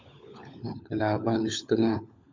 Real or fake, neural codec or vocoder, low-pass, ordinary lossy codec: fake; codec, 16 kHz, 16 kbps, FunCodec, trained on LibriTTS, 50 frames a second; 7.2 kHz; MP3, 64 kbps